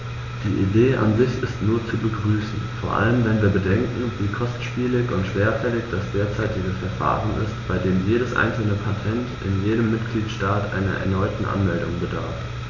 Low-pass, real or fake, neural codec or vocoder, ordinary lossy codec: 7.2 kHz; real; none; none